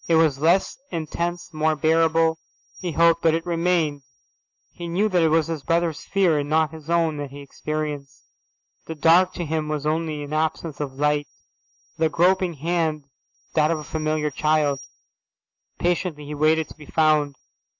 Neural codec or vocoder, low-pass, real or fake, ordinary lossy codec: none; 7.2 kHz; real; AAC, 48 kbps